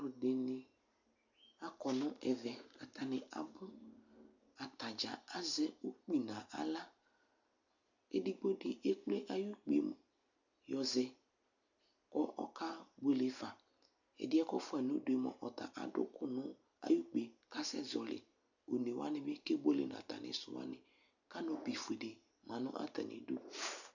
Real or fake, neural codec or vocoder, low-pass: real; none; 7.2 kHz